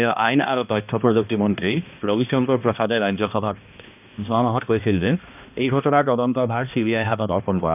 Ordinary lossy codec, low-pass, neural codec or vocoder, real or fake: none; 3.6 kHz; codec, 16 kHz, 1 kbps, X-Codec, HuBERT features, trained on balanced general audio; fake